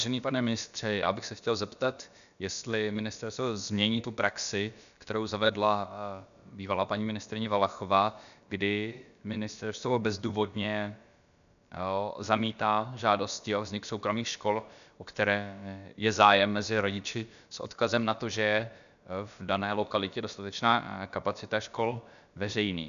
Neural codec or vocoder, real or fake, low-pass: codec, 16 kHz, about 1 kbps, DyCAST, with the encoder's durations; fake; 7.2 kHz